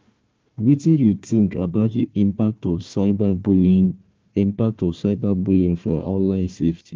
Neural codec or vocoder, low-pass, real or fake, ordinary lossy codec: codec, 16 kHz, 1 kbps, FunCodec, trained on Chinese and English, 50 frames a second; 7.2 kHz; fake; Opus, 24 kbps